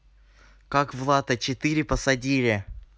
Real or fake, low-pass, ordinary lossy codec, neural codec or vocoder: real; none; none; none